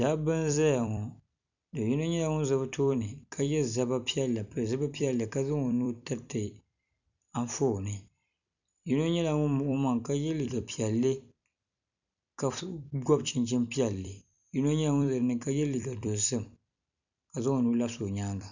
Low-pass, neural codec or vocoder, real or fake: 7.2 kHz; vocoder, 44.1 kHz, 128 mel bands every 256 samples, BigVGAN v2; fake